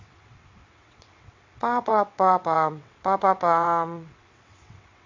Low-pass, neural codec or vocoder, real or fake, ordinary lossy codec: 7.2 kHz; vocoder, 22.05 kHz, 80 mel bands, WaveNeXt; fake; MP3, 48 kbps